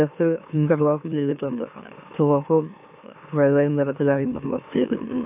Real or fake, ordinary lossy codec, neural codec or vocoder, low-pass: fake; AAC, 32 kbps; autoencoder, 44.1 kHz, a latent of 192 numbers a frame, MeloTTS; 3.6 kHz